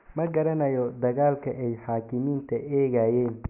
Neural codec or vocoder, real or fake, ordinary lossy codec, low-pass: none; real; none; 3.6 kHz